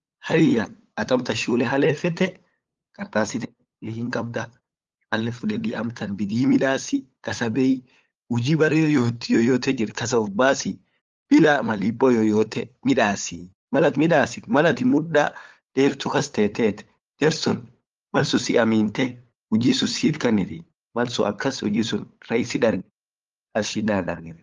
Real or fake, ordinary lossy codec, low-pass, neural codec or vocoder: fake; Opus, 32 kbps; 7.2 kHz; codec, 16 kHz, 8 kbps, FunCodec, trained on LibriTTS, 25 frames a second